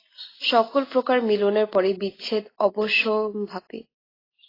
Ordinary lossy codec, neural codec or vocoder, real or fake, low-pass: AAC, 24 kbps; none; real; 5.4 kHz